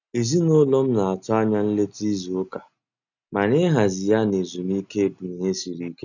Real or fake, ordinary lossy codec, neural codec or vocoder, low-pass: real; none; none; 7.2 kHz